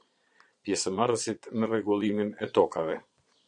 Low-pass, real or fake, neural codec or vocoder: 9.9 kHz; fake; vocoder, 22.05 kHz, 80 mel bands, Vocos